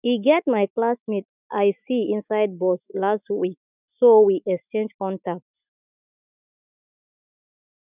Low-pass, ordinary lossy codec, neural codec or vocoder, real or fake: 3.6 kHz; none; autoencoder, 48 kHz, 128 numbers a frame, DAC-VAE, trained on Japanese speech; fake